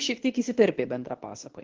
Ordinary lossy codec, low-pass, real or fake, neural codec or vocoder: Opus, 16 kbps; 7.2 kHz; fake; codec, 24 kHz, 0.9 kbps, WavTokenizer, medium speech release version 2